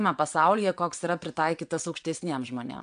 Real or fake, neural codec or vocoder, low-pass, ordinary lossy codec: fake; vocoder, 22.05 kHz, 80 mel bands, WaveNeXt; 9.9 kHz; MP3, 64 kbps